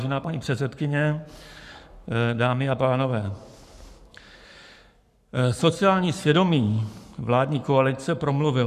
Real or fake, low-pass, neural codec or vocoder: fake; 14.4 kHz; codec, 44.1 kHz, 7.8 kbps, Pupu-Codec